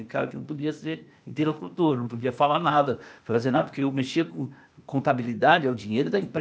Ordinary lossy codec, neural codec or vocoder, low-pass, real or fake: none; codec, 16 kHz, 0.8 kbps, ZipCodec; none; fake